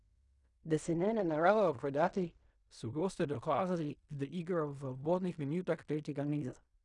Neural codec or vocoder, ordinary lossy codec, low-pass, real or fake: codec, 16 kHz in and 24 kHz out, 0.4 kbps, LongCat-Audio-Codec, fine tuned four codebook decoder; none; 10.8 kHz; fake